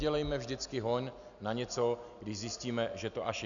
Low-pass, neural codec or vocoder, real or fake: 7.2 kHz; none; real